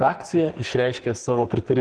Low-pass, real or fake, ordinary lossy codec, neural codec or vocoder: 10.8 kHz; fake; Opus, 16 kbps; codec, 44.1 kHz, 2.6 kbps, SNAC